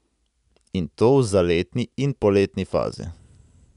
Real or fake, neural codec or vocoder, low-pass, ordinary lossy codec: real; none; 10.8 kHz; none